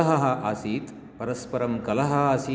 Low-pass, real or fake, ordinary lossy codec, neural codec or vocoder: none; real; none; none